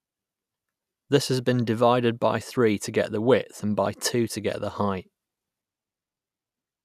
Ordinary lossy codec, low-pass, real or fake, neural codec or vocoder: none; 14.4 kHz; real; none